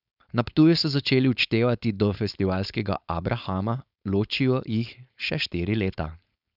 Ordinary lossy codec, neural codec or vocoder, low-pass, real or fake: none; codec, 16 kHz, 4.8 kbps, FACodec; 5.4 kHz; fake